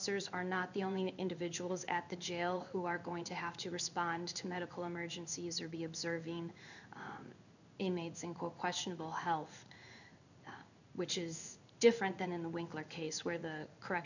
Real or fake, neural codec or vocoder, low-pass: fake; codec, 16 kHz in and 24 kHz out, 1 kbps, XY-Tokenizer; 7.2 kHz